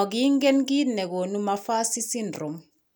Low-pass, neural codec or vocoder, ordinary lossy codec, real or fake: none; none; none; real